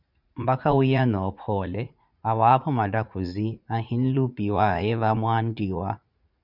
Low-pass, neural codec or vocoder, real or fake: 5.4 kHz; vocoder, 44.1 kHz, 80 mel bands, Vocos; fake